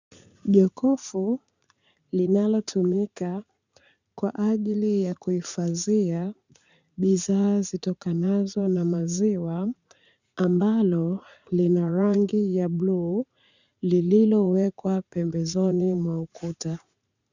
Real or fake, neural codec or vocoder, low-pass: fake; vocoder, 44.1 kHz, 80 mel bands, Vocos; 7.2 kHz